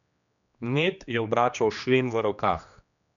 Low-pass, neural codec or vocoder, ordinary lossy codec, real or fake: 7.2 kHz; codec, 16 kHz, 2 kbps, X-Codec, HuBERT features, trained on general audio; none; fake